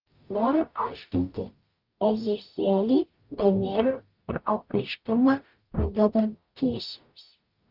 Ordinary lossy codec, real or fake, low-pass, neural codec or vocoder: Opus, 24 kbps; fake; 5.4 kHz; codec, 44.1 kHz, 0.9 kbps, DAC